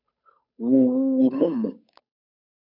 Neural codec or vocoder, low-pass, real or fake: codec, 16 kHz, 8 kbps, FunCodec, trained on Chinese and English, 25 frames a second; 5.4 kHz; fake